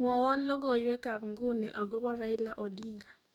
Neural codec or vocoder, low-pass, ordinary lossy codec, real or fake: codec, 44.1 kHz, 2.6 kbps, DAC; 19.8 kHz; none; fake